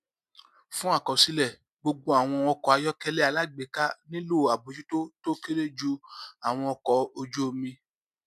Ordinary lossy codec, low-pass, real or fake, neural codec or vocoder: none; 14.4 kHz; real; none